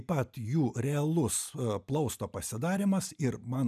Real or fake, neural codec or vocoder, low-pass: real; none; 14.4 kHz